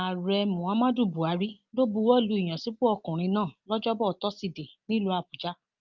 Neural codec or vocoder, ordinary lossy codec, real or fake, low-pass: none; Opus, 32 kbps; real; 7.2 kHz